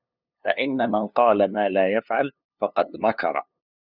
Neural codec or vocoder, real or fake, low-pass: codec, 16 kHz, 2 kbps, FunCodec, trained on LibriTTS, 25 frames a second; fake; 5.4 kHz